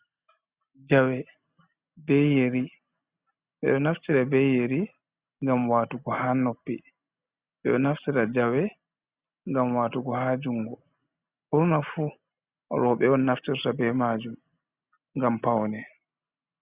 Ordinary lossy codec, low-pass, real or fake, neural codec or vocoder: Opus, 64 kbps; 3.6 kHz; real; none